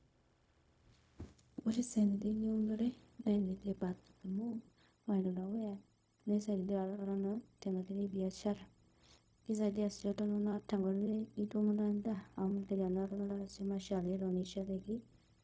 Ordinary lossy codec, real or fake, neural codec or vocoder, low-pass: none; fake; codec, 16 kHz, 0.4 kbps, LongCat-Audio-Codec; none